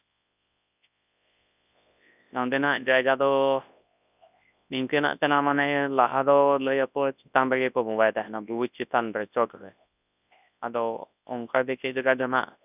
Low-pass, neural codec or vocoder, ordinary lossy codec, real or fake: 3.6 kHz; codec, 24 kHz, 0.9 kbps, WavTokenizer, large speech release; none; fake